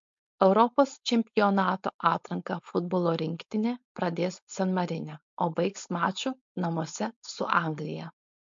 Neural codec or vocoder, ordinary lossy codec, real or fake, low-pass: codec, 16 kHz, 4.8 kbps, FACodec; MP3, 48 kbps; fake; 7.2 kHz